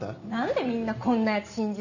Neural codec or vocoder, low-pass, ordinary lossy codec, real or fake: none; 7.2 kHz; none; real